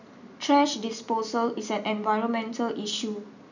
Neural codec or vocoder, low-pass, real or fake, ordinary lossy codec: none; 7.2 kHz; real; none